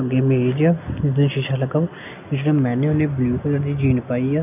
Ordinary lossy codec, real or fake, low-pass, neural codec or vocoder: none; real; 3.6 kHz; none